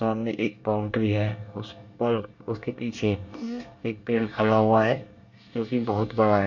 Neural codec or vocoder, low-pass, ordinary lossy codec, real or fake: codec, 24 kHz, 1 kbps, SNAC; 7.2 kHz; MP3, 64 kbps; fake